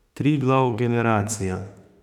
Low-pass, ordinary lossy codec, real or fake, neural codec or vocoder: 19.8 kHz; none; fake; autoencoder, 48 kHz, 32 numbers a frame, DAC-VAE, trained on Japanese speech